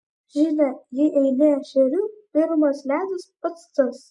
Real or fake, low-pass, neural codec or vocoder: real; 10.8 kHz; none